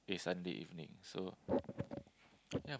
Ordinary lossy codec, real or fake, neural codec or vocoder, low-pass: none; real; none; none